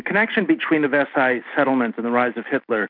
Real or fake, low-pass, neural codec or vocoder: real; 5.4 kHz; none